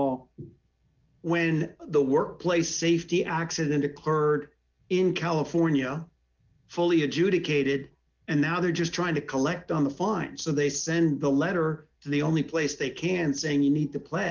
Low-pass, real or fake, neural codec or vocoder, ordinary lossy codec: 7.2 kHz; real; none; Opus, 32 kbps